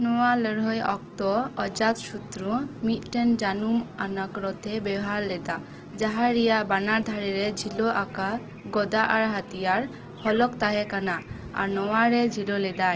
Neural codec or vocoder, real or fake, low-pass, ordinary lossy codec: none; real; 7.2 kHz; Opus, 16 kbps